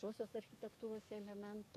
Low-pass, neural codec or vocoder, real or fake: 14.4 kHz; codec, 44.1 kHz, 7.8 kbps, DAC; fake